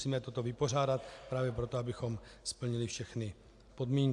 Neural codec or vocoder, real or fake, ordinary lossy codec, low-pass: none; real; AAC, 64 kbps; 10.8 kHz